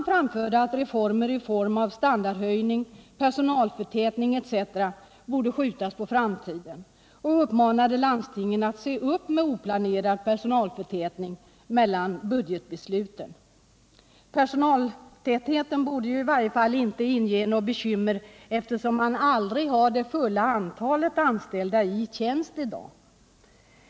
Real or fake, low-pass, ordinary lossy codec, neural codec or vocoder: real; none; none; none